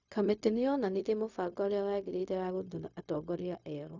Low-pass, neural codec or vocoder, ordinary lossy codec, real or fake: 7.2 kHz; codec, 16 kHz, 0.4 kbps, LongCat-Audio-Codec; none; fake